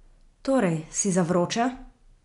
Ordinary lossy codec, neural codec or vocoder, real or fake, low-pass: none; none; real; 10.8 kHz